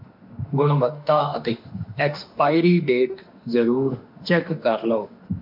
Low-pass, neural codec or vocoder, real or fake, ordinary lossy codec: 5.4 kHz; autoencoder, 48 kHz, 32 numbers a frame, DAC-VAE, trained on Japanese speech; fake; MP3, 48 kbps